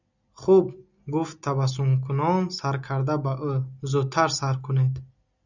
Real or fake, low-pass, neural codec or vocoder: real; 7.2 kHz; none